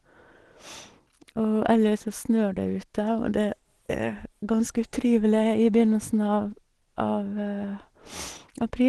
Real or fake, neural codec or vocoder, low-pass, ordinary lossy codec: real; none; 10.8 kHz; Opus, 16 kbps